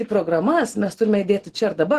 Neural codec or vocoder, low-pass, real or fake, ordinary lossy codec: none; 14.4 kHz; real; Opus, 16 kbps